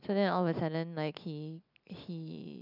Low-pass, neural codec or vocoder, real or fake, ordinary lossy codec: 5.4 kHz; none; real; none